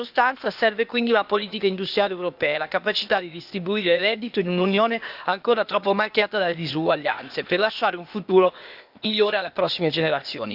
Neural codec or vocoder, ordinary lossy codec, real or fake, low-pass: codec, 16 kHz, 0.8 kbps, ZipCodec; Opus, 64 kbps; fake; 5.4 kHz